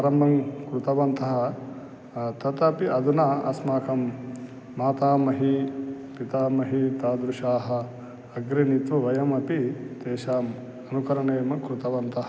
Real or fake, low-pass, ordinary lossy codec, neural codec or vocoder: real; none; none; none